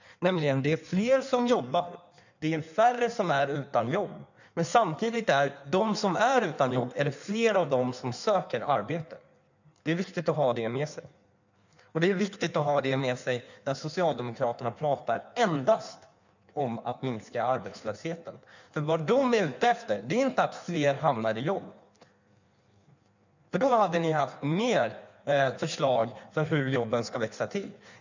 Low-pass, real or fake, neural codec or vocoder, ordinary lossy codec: 7.2 kHz; fake; codec, 16 kHz in and 24 kHz out, 1.1 kbps, FireRedTTS-2 codec; none